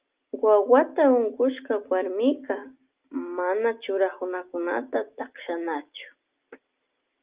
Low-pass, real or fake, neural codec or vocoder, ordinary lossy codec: 3.6 kHz; real; none; Opus, 32 kbps